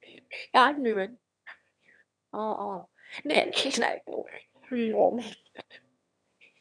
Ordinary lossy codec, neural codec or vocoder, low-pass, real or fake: none; autoencoder, 22.05 kHz, a latent of 192 numbers a frame, VITS, trained on one speaker; 9.9 kHz; fake